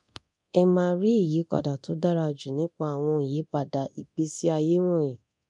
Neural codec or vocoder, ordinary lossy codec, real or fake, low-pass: codec, 24 kHz, 0.9 kbps, DualCodec; MP3, 64 kbps; fake; 10.8 kHz